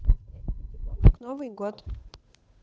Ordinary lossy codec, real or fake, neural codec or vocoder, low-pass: none; fake; codec, 16 kHz, 8 kbps, FunCodec, trained on Chinese and English, 25 frames a second; none